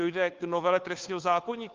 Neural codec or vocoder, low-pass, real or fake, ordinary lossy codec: codec, 16 kHz, 2 kbps, FunCodec, trained on Chinese and English, 25 frames a second; 7.2 kHz; fake; Opus, 24 kbps